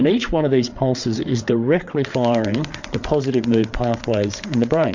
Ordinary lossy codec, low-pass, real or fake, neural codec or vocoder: MP3, 48 kbps; 7.2 kHz; fake; codec, 16 kHz, 8 kbps, FreqCodec, larger model